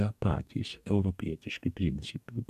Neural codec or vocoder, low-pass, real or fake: codec, 44.1 kHz, 2.6 kbps, DAC; 14.4 kHz; fake